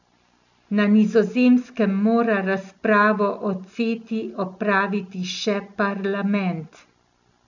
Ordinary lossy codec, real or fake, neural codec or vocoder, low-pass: none; real; none; 7.2 kHz